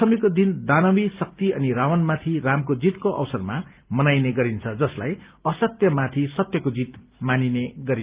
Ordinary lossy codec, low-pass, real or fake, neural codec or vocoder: Opus, 32 kbps; 3.6 kHz; real; none